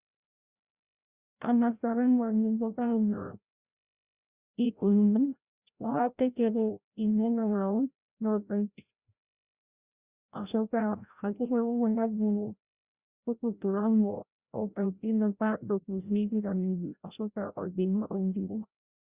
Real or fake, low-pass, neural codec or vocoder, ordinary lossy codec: fake; 3.6 kHz; codec, 16 kHz, 0.5 kbps, FreqCodec, larger model; Opus, 64 kbps